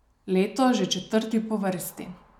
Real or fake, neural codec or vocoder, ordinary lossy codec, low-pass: real; none; none; 19.8 kHz